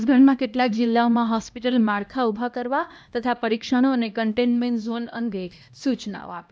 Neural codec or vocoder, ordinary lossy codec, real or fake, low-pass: codec, 16 kHz, 1 kbps, X-Codec, HuBERT features, trained on LibriSpeech; none; fake; none